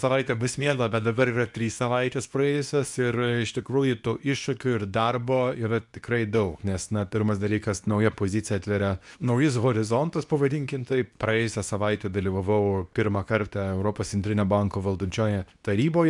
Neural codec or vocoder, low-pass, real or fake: codec, 24 kHz, 0.9 kbps, WavTokenizer, medium speech release version 2; 10.8 kHz; fake